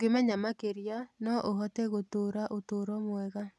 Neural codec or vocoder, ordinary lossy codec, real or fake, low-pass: none; none; real; none